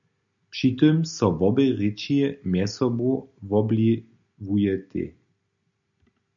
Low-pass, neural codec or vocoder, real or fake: 7.2 kHz; none; real